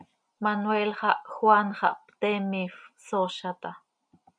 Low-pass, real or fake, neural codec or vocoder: 9.9 kHz; real; none